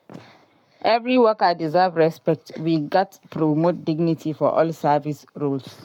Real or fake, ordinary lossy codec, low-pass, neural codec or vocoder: fake; none; 19.8 kHz; codec, 44.1 kHz, 7.8 kbps, Pupu-Codec